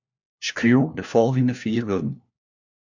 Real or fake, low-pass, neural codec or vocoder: fake; 7.2 kHz; codec, 16 kHz, 1 kbps, FunCodec, trained on LibriTTS, 50 frames a second